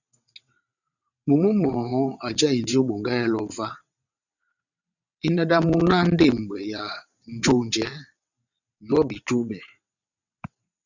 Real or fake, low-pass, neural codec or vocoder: fake; 7.2 kHz; vocoder, 22.05 kHz, 80 mel bands, WaveNeXt